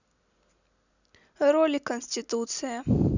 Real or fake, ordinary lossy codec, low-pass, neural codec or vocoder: real; none; 7.2 kHz; none